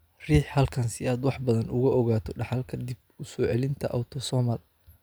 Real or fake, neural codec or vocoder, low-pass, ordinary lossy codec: real; none; none; none